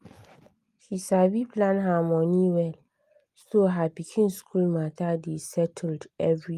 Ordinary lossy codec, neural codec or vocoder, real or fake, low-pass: Opus, 32 kbps; none; real; 14.4 kHz